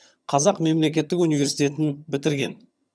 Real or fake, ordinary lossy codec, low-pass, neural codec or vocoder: fake; none; none; vocoder, 22.05 kHz, 80 mel bands, HiFi-GAN